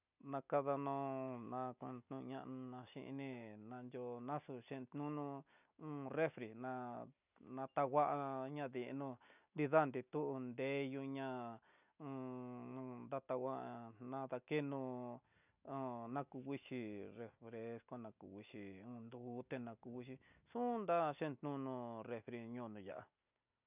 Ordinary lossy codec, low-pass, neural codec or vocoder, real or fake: none; 3.6 kHz; none; real